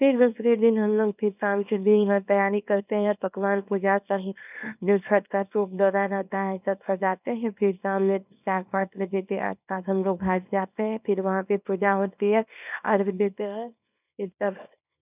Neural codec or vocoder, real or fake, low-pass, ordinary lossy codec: codec, 24 kHz, 0.9 kbps, WavTokenizer, small release; fake; 3.6 kHz; none